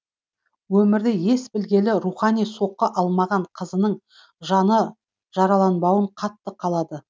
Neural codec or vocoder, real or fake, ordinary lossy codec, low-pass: none; real; none; none